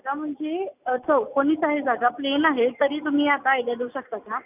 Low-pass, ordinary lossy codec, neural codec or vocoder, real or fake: 3.6 kHz; none; none; real